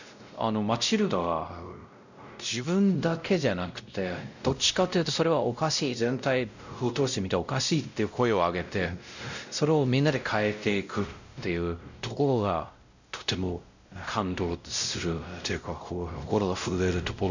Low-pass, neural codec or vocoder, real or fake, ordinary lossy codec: 7.2 kHz; codec, 16 kHz, 0.5 kbps, X-Codec, WavLM features, trained on Multilingual LibriSpeech; fake; none